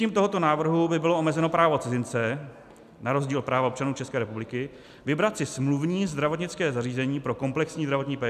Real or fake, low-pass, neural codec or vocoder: real; 14.4 kHz; none